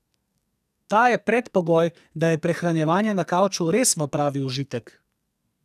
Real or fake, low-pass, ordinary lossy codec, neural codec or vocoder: fake; 14.4 kHz; none; codec, 44.1 kHz, 2.6 kbps, SNAC